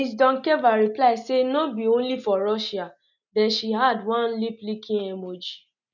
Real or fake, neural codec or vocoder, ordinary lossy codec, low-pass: real; none; none; 7.2 kHz